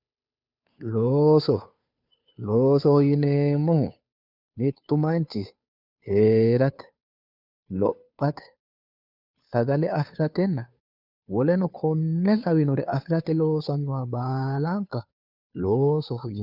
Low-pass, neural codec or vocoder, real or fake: 5.4 kHz; codec, 16 kHz, 2 kbps, FunCodec, trained on Chinese and English, 25 frames a second; fake